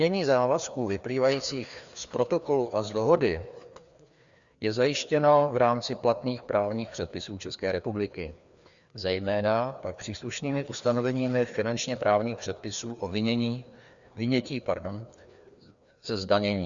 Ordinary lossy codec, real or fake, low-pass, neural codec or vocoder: Opus, 64 kbps; fake; 7.2 kHz; codec, 16 kHz, 2 kbps, FreqCodec, larger model